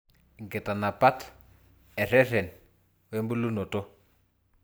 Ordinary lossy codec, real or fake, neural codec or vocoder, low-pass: none; real; none; none